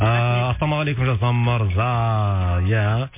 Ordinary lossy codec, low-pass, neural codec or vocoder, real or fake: MP3, 24 kbps; 3.6 kHz; none; real